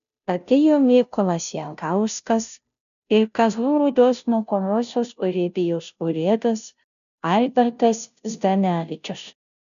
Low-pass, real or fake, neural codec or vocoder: 7.2 kHz; fake; codec, 16 kHz, 0.5 kbps, FunCodec, trained on Chinese and English, 25 frames a second